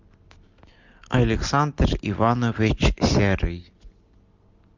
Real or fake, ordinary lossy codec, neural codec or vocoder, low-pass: real; MP3, 64 kbps; none; 7.2 kHz